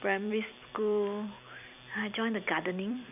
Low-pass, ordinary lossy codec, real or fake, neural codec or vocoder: 3.6 kHz; none; fake; vocoder, 44.1 kHz, 128 mel bands every 256 samples, BigVGAN v2